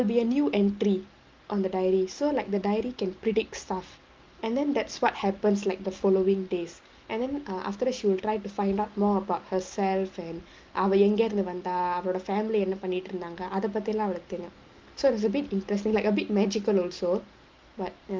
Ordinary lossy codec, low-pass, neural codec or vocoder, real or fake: Opus, 32 kbps; 7.2 kHz; none; real